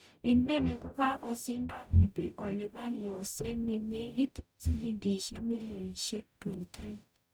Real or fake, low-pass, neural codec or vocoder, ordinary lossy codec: fake; none; codec, 44.1 kHz, 0.9 kbps, DAC; none